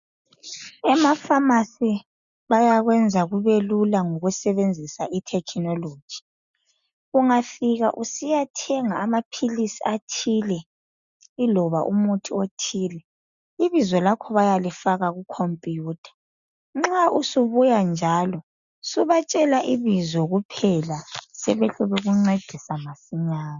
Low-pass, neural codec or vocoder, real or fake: 7.2 kHz; none; real